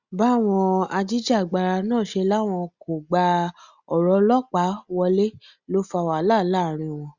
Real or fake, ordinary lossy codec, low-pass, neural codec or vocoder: real; Opus, 64 kbps; 7.2 kHz; none